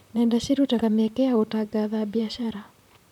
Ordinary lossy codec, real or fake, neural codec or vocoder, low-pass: none; fake; vocoder, 44.1 kHz, 128 mel bands, Pupu-Vocoder; 19.8 kHz